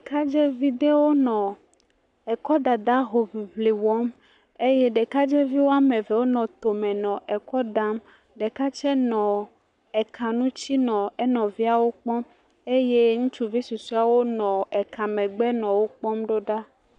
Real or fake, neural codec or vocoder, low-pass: fake; codec, 44.1 kHz, 7.8 kbps, Pupu-Codec; 10.8 kHz